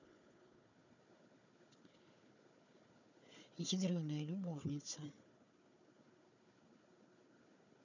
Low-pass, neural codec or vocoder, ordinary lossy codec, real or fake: 7.2 kHz; codec, 16 kHz, 4 kbps, FunCodec, trained on Chinese and English, 50 frames a second; none; fake